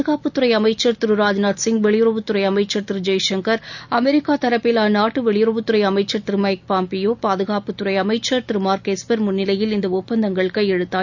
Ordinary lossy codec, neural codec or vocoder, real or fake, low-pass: none; none; real; 7.2 kHz